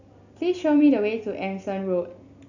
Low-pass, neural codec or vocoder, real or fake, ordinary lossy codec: 7.2 kHz; none; real; none